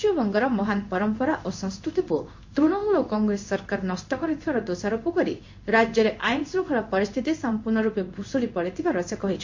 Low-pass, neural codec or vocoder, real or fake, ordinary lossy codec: 7.2 kHz; codec, 16 kHz in and 24 kHz out, 1 kbps, XY-Tokenizer; fake; MP3, 48 kbps